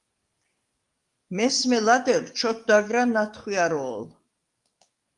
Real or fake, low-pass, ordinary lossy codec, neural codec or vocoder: fake; 10.8 kHz; Opus, 32 kbps; codec, 44.1 kHz, 7.8 kbps, DAC